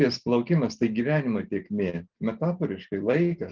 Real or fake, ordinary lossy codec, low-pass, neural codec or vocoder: real; Opus, 16 kbps; 7.2 kHz; none